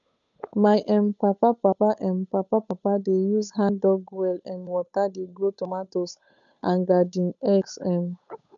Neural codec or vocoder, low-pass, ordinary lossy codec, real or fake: codec, 16 kHz, 8 kbps, FunCodec, trained on Chinese and English, 25 frames a second; 7.2 kHz; none; fake